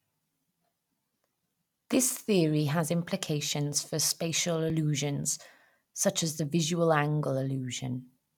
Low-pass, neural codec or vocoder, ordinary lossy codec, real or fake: 19.8 kHz; none; none; real